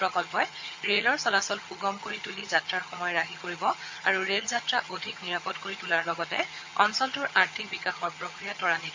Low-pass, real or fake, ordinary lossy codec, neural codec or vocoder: 7.2 kHz; fake; MP3, 48 kbps; vocoder, 22.05 kHz, 80 mel bands, HiFi-GAN